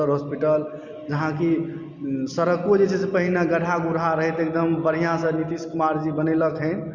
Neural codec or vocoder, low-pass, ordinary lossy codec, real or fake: none; 7.2 kHz; Opus, 64 kbps; real